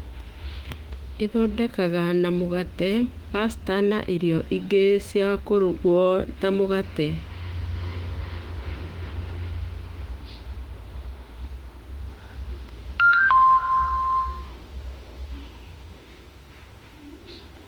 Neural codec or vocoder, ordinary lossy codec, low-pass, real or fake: autoencoder, 48 kHz, 32 numbers a frame, DAC-VAE, trained on Japanese speech; Opus, 24 kbps; 19.8 kHz; fake